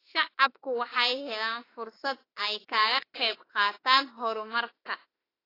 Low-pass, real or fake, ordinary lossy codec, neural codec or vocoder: 5.4 kHz; fake; AAC, 24 kbps; autoencoder, 48 kHz, 32 numbers a frame, DAC-VAE, trained on Japanese speech